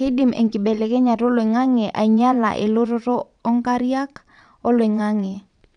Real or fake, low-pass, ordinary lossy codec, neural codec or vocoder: fake; 9.9 kHz; none; vocoder, 22.05 kHz, 80 mel bands, Vocos